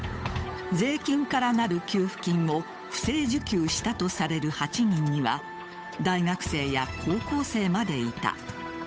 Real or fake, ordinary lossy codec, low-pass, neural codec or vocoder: fake; none; none; codec, 16 kHz, 8 kbps, FunCodec, trained on Chinese and English, 25 frames a second